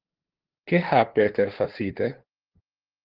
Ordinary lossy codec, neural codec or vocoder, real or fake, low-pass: Opus, 16 kbps; codec, 16 kHz, 2 kbps, FunCodec, trained on LibriTTS, 25 frames a second; fake; 5.4 kHz